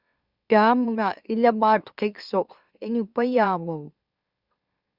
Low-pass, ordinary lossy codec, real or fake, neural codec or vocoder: 5.4 kHz; Opus, 64 kbps; fake; autoencoder, 44.1 kHz, a latent of 192 numbers a frame, MeloTTS